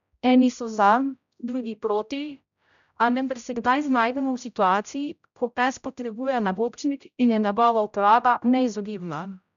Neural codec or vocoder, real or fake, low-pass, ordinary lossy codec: codec, 16 kHz, 0.5 kbps, X-Codec, HuBERT features, trained on general audio; fake; 7.2 kHz; MP3, 96 kbps